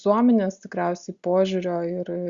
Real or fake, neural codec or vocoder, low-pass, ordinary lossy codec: real; none; 7.2 kHz; Opus, 64 kbps